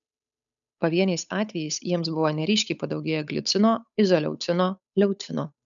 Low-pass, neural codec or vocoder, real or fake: 7.2 kHz; codec, 16 kHz, 8 kbps, FunCodec, trained on Chinese and English, 25 frames a second; fake